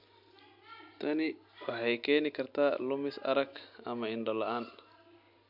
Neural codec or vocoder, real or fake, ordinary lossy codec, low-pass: none; real; MP3, 48 kbps; 5.4 kHz